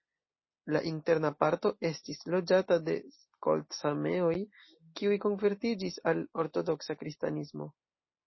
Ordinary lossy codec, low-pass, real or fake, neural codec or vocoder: MP3, 24 kbps; 7.2 kHz; real; none